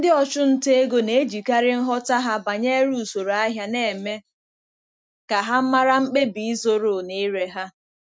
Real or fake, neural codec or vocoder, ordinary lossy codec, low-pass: real; none; none; none